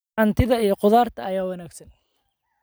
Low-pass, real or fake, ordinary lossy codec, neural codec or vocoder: none; real; none; none